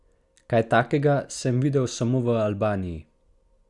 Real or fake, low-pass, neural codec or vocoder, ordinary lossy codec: real; 10.8 kHz; none; Opus, 64 kbps